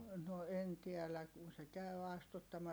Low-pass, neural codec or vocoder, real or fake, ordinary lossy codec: none; none; real; none